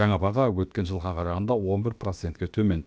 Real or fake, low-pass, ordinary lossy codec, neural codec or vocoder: fake; none; none; codec, 16 kHz, about 1 kbps, DyCAST, with the encoder's durations